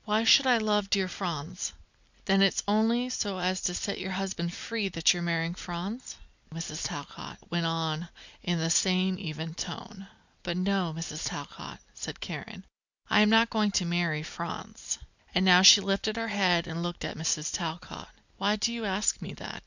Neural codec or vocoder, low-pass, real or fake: none; 7.2 kHz; real